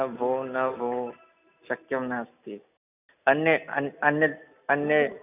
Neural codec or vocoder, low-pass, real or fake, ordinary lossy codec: none; 3.6 kHz; real; none